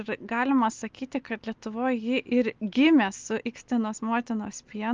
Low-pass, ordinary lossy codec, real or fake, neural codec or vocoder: 7.2 kHz; Opus, 32 kbps; real; none